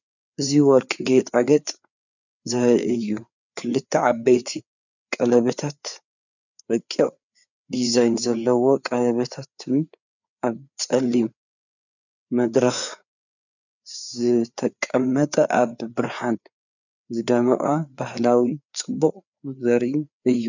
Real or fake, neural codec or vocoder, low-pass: fake; codec, 16 kHz, 4 kbps, FreqCodec, larger model; 7.2 kHz